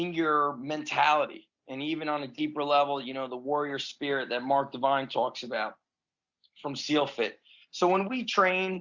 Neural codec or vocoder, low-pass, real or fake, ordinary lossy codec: none; 7.2 kHz; real; Opus, 64 kbps